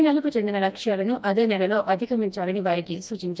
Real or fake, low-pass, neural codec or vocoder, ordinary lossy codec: fake; none; codec, 16 kHz, 1 kbps, FreqCodec, smaller model; none